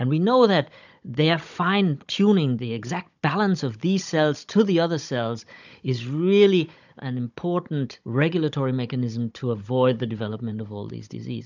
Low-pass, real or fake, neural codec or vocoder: 7.2 kHz; fake; codec, 16 kHz, 16 kbps, FunCodec, trained on Chinese and English, 50 frames a second